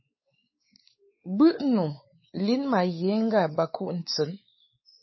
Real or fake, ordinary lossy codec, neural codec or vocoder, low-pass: fake; MP3, 24 kbps; codec, 16 kHz, 4 kbps, X-Codec, WavLM features, trained on Multilingual LibriSpeech; 7.2 kHz